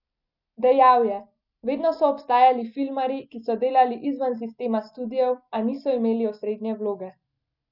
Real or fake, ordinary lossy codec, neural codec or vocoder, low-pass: real; none; none; 5.4 kHz